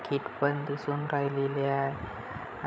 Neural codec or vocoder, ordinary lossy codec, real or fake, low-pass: codec, 16 kHz, 16 kbps, FreqCodec, larger model; none; fake; none